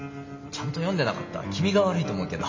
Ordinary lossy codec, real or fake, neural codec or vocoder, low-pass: MP3, 32 kbps; real; none; 7.2 kHz